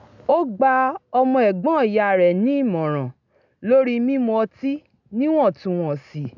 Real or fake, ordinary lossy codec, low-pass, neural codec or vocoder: real; none; 7.2 kHz; none